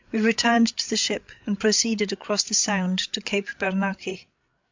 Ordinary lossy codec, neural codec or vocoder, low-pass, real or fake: MP3, 64 kbps; codec, 16 kHz, 8 kbps, FreqCodec, larger model; 7.2 kHz; fake